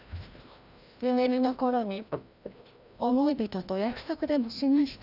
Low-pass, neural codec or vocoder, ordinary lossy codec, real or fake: 5.4 kHz; codec, 16 kHz, 1 kbps, FreqCodec, larger model; none; fake